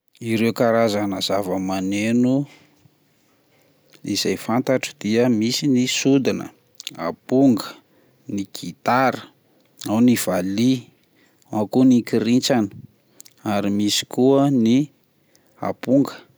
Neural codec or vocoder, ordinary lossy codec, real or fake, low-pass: none; none; real; none